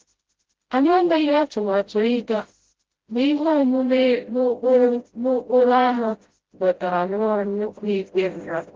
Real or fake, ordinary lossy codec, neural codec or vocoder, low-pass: fake; Opus, 16 kbps; codec, 16 kHz, 0.5 kbps, FreqCodec, smaller model; 7.2 kHz